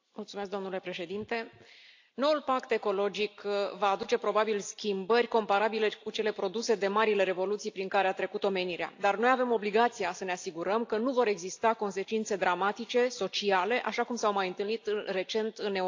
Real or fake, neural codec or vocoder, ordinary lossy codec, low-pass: real; none; AAC, 48 kbps; 7.2 kHz